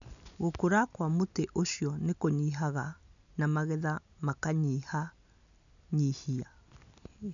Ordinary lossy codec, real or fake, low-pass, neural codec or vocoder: none; real; 7.2 kHz; none